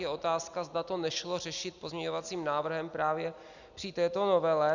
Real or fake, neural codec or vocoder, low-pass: real; none; 7.2 kHz